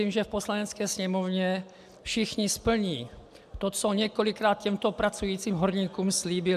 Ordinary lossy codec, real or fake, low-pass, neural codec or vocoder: AAC, 96 kbps; real; 14.4 kHz; none